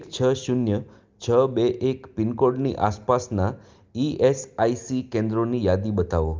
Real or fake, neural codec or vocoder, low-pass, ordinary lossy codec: real; none; 7.2 kHz; Opus, 24 kbps